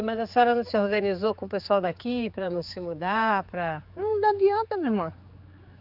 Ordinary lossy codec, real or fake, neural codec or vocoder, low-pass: Opus, 64 kbps; fake; codec, 16 kHz, 4 kbps, X-Codec, HuBERT features, trained on general audio; 5.4 kHz